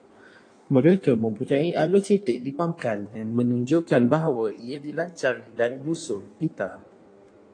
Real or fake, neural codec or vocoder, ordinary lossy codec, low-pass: fake; codec, 16 kHz in and 24 kHz out, 1.1 kbps, FireRedTTS-2 codec; AAC, 48 kbps; 9.9 kHz